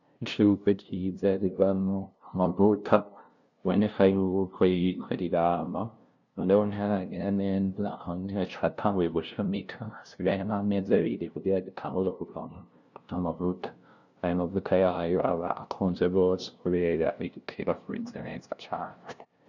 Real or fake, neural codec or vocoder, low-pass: fake; codec, 16 kHz, 0.5 kbps, FunCodec, trained on LibriTTS, 25 frames a second; 7.2 kHz